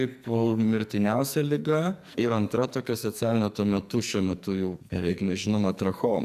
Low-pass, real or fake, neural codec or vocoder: 14.4 kHz; fake; codec, 44.1 kHz, 2.6 kbps, SNAC